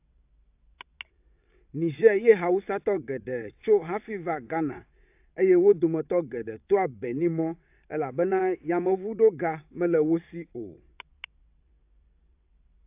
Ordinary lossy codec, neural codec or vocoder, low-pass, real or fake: AAC, 32 kbps; vocoder, 22.05 kHz, 80 mel bands, WaveNeXt; 3.6 kHz; fake